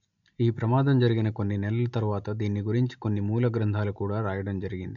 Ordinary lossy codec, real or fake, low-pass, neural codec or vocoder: none; real; 7.2 kHz; none